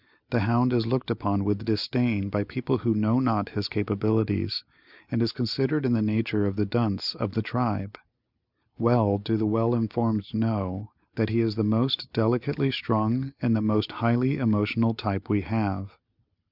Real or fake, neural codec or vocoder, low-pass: real; none; 5.4 kHz